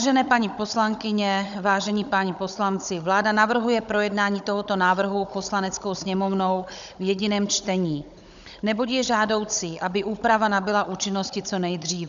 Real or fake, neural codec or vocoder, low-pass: fake; codec, 16 kHz, 16 kbps, FunCodec, trained on Chinese and English, 50 frames a second; 7.2 kHz